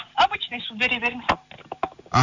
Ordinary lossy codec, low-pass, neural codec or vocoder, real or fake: none; 7.2 kHz; none; real